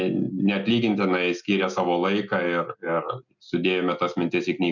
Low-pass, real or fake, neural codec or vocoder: 7.2 kHz; real; none